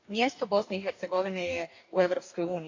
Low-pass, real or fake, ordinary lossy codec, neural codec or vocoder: 7.2 kHz; fake; AAC, 48 kbps; codec, 44.1 kHz, 2.6 kbps, DAC